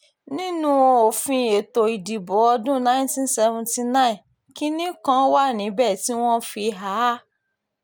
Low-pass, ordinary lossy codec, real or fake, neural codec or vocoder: none; none; real; none